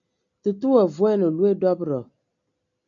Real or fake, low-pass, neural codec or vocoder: real; 7.2 kHz; none